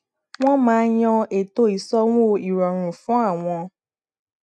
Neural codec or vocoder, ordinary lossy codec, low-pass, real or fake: none; none; none; real